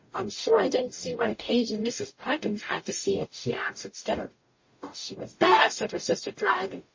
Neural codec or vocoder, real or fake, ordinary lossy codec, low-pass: codec, 44.1 kHz, 0.9 kbps, DAC; fake; MP3, 32 kbps; 7.2 kHz